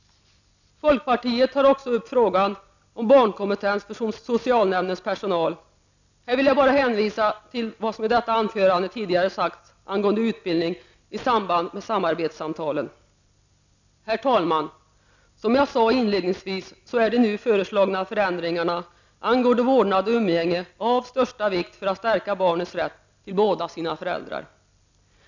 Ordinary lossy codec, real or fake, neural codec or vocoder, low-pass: none; real; none; 7.2 kHz